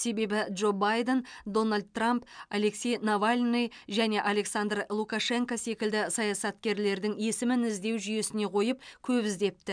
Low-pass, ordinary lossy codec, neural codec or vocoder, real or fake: 9.9 kHz; MP3, 96 kbps; none; real